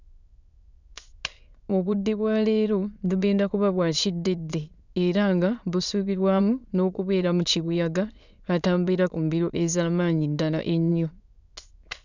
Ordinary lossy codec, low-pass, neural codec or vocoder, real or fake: none; 7.2 kHz; autoencoder, 22.05 kHz, a latent of 192 numbers a frame, VITS, trained on many speakers; fake